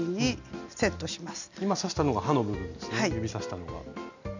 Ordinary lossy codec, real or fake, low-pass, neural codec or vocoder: none; real; 7.2 kHz; none